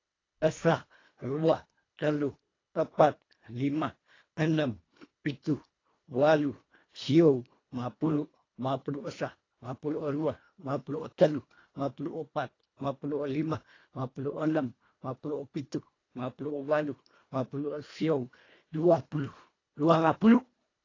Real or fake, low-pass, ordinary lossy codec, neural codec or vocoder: fake; 7.2 kHz; AAC, 32 kbps; codec, 24 kHz, 1.5 kbps, HILCodec